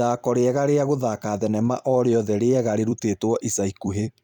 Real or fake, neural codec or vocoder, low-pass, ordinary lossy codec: fake; vocoder, 44.1 kHz, 128 mel bands every 256 samples, BigVGAN v2; 19.8 kHz; none